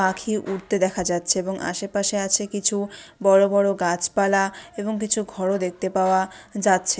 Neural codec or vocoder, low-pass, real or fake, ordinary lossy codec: none; none; real; none